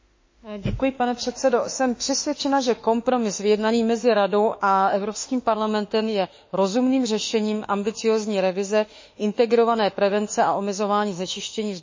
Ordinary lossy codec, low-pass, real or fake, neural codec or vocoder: MP3, 32 kbps; 7.2 kHz; fake; autoencoder, 48 kHz, 32 numbers a frame, DAC-VAE, trained on Japanese speech